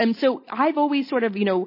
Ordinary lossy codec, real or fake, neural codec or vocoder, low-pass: MP3, 24 kbps; real; none; 5.4 kHz